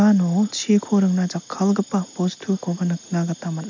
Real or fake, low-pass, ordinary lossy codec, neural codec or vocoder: real; 7.2 kHz; MP3, 64 kbps; none